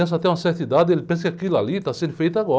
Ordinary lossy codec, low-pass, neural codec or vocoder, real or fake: none; none; none; real